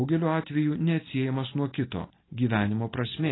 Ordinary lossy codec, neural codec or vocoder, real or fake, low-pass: AAC, 16 kbps; none; real; 7.2 kHz